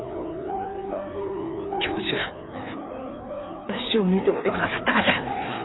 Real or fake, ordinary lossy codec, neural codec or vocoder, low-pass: fake; AAC, 16 kbps; codec, 16 kHz, 2 kbps, FreqCodec, larger model; 7.2 kHz